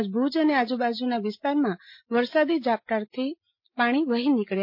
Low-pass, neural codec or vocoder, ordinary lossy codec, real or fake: 5.4 kHz; none; MP3, 32 kbps; real